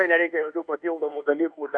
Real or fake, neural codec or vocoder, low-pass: fake; codec, 24 kHz, 1.2 kbps, DualCodec; 9.9 kHz